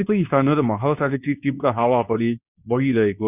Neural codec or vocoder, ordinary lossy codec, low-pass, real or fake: codec, 24 kHz, 0.9 kbps, WavTokenizer, medium speech release version 2; none; 3.6 kHz; fake